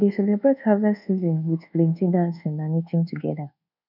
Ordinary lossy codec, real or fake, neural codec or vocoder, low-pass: none; fake; codec, 24 kHz, 1.2 kbps, DualCodec; 5.4 kHz